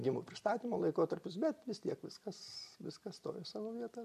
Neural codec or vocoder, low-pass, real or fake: none; 14.4 kHz; real